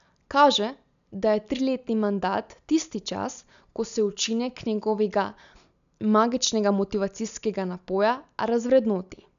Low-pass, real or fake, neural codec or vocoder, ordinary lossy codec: 7.2 kHz; real; none; none